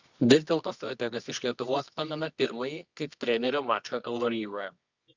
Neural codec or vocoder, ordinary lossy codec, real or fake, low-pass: codec, 24 kHz, 0.9 kbps, WavTokenizer, medium music audio release; Opus, 64 kbps; fake; 7.2 kHz